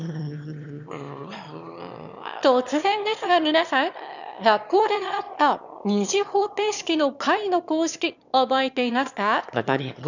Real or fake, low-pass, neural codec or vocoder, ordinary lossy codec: fake; 7.2 kHz; autoencoder, 22.05 kHz, a latent of 192 numbers a frame, VITS, trained on one speaker; none